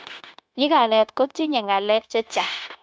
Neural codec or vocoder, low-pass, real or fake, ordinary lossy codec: codec, 16 kHz, 0.9 kbps, LongCat-Audio-Codec; none; fake; none